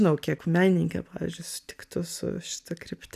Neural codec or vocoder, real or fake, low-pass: none; real; 14.4 kHz